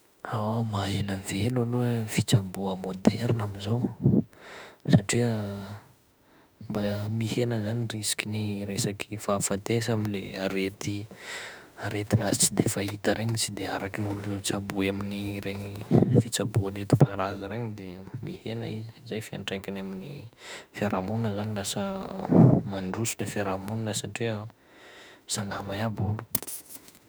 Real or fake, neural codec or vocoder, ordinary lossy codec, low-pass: fake; autoencoder, 48 kHz, 32 numbers a frame, DAC-VAE, trained on Japanese speech; none; none